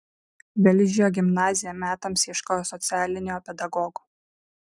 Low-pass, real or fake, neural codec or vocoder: 10.8 kHz; real; none